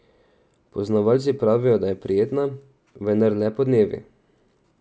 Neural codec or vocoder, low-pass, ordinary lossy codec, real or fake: none; none; none; real